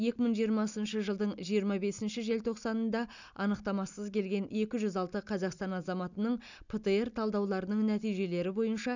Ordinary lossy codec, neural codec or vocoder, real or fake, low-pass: none; none; real; 7.2 kHz